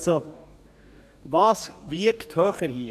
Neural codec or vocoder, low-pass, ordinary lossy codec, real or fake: codec, 44.1 kHz, 2.6 kbps, DAC; 14.4 kHz; none; fake